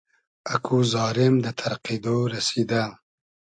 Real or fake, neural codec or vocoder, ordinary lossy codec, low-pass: real; none; AAC, 64 kbps; 9.9 kHz